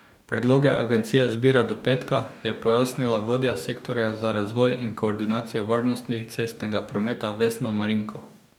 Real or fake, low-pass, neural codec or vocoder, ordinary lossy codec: fake; 19.8 kHz; codec, 44.1 kHz, 2.6 kbps, DAC; none